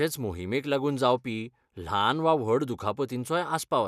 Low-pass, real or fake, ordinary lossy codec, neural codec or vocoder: 14.4 kHz; real; none; none